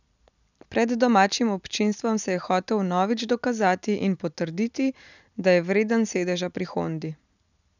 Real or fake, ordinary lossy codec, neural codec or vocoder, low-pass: real; none; none; 7.2 kHz